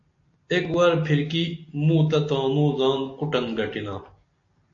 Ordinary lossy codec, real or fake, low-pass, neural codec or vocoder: AAC, 48 kbps; real; 7.2 kHz; none